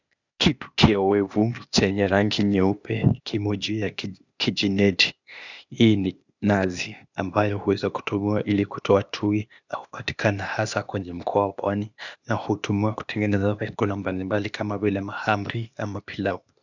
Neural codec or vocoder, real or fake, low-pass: codec, 16 kHz, 0.8 kbps, ZipCodec; fake; 7.2 kHz